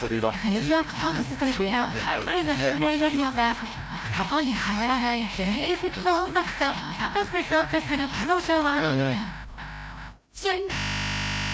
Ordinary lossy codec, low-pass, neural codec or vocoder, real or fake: none; none; codec, 16 kHz, 0.5 kbps, FreqCodec, larger model; fake